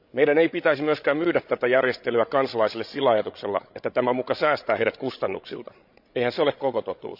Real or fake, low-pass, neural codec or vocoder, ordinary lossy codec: fake; 5.4 kHz; codec, 16 kHz, 16 kbps, FreqCodec, larger model; none